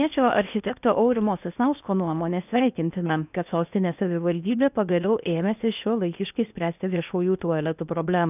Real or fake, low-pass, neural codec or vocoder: fake; 3.6 kHz; codec, 16 kHz in and 24 kHz out, 0.8 kbps, FocalCodec, streaming, 65536 codes